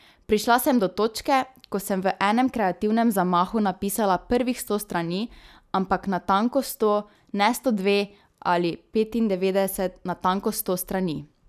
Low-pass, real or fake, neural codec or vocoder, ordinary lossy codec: 14.4 kHz; real; none; none